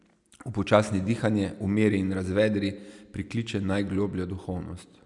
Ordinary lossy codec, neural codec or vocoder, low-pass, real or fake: none; none; 10.8 kHz; real